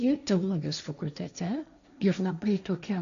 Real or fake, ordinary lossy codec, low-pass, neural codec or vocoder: fake; MP3, 96 kbps; 7.2 kHz; codec, 16 kHz, 1.1 kbps, Voila-Tokenizer